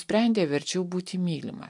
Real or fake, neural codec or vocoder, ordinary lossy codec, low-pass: real; none; MP3, 64 kbps; 10.8 kHz